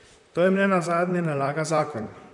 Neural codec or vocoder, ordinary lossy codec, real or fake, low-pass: vocoder, 44.1 kHz, 128 mel bands, Pupu-Vocoder; none; fake; 10.8 kHz